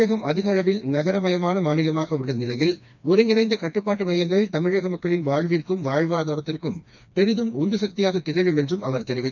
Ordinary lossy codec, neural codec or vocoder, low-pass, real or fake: none; codec, 16 kHz, 2 kbps, FreqCodec, smaller model; 7.2 kHz; fake